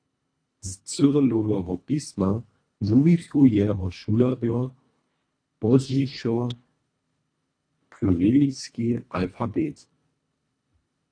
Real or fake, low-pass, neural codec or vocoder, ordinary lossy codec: fake; 9.9 kHz; codec, 24 kHz, 1.5 kbps, HILCodec; AAC, 48 kbps